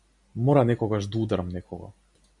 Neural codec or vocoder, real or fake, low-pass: none; real; 10.8 kHz